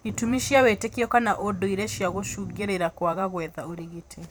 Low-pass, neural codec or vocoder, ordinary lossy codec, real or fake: none; vocoder, 44.1 kHz, 128 mel bands every 512 samples, BigVGAN v2; none; fake